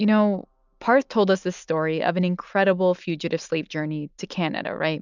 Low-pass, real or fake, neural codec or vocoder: 7.2 kHz; real; none